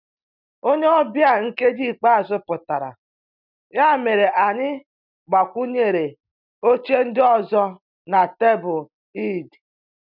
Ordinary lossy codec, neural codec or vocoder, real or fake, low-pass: none; none; real; 5.4 kHz